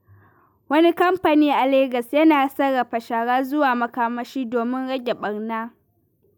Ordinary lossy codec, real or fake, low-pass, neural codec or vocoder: none; real; none; none